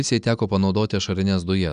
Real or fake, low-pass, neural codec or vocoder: real; 9.9 kHz; none